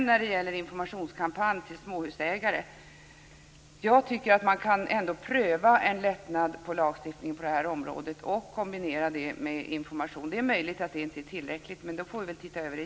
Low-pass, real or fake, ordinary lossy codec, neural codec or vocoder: none; real; none; none